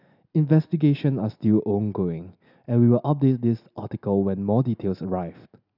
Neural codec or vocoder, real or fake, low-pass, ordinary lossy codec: none; real; 5.4 kHz; none